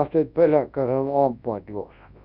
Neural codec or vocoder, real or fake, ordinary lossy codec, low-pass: codec, 24 kHz, 0.9 kbps, WavTokenizer, large speech release; fake; Opus, 64 kbps; 5.4 kHz